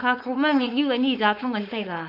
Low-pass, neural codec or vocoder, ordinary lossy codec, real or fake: 5.4 kHz; codec, 16 kHz, 4.8 kbps, FACodec; none; fake